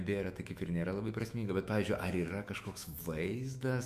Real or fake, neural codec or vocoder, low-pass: fake; autoencoder, 48 kHz, 128 numbers a frame, DAC-VAE, trained on Japanese speech; 14.4 kHz